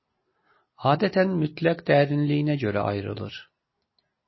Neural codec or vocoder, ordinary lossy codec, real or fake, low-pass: none; MP3, 24 kbps; real; 7.2 kHz